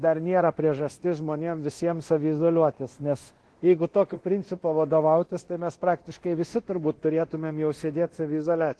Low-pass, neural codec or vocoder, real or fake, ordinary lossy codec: 10.8 kHz; codec, 24 kHz, 0.9 kbps, DualCodec; fake; Opus, 16 kbps